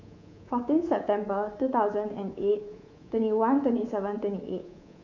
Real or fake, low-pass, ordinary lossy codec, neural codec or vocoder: fake; 7.2 kHz; none; codec, 24 kHz, 3.1 kbps, DualCodec